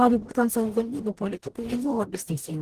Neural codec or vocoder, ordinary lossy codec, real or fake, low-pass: codec, 44.1 kHz, 0.9 kbps, DAC; Opus, 16 kbps; fake; 14.4 kHz